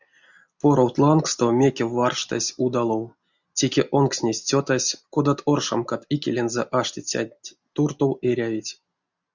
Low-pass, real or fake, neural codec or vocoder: 7.2 kHz; real; none